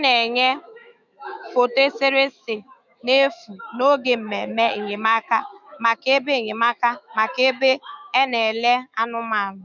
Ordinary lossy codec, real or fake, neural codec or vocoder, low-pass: none; fake; autoencoder, 48 kHz, 128 numbers a frame, DAC-VAE, trained on Japanese speech; 7.2 kHz